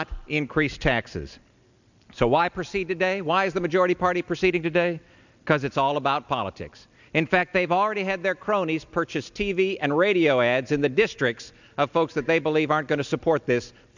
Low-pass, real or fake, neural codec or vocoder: 7.2 kHz; real; none